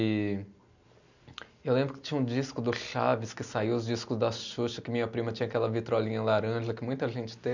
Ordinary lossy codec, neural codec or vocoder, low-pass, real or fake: none; none; 7.2 kHz; real